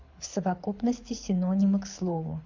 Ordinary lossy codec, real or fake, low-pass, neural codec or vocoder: MP3, 48 kbps; fake; 7.2 kHz; codec, 24 kHz, 6 kbps, HILCodec